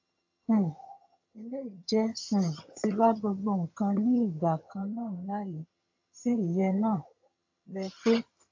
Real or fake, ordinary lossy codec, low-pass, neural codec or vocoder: fake; AAC, 48 kbps; 7.2 kHz; vocoder, 22.05 kHz, 80 mel bands, HiFi-GAN